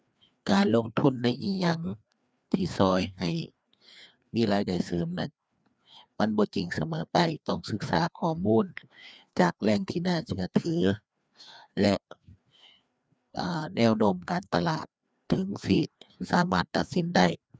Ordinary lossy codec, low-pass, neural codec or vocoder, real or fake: none; none; codec, 16 kHz, 2 kbps, FreqCodec, larger model; fake